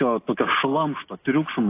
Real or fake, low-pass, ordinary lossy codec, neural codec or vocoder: real; 3.6 kHz; AAC, 24 kbps; none